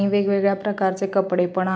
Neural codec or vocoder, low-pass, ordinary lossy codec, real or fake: none; none; none; real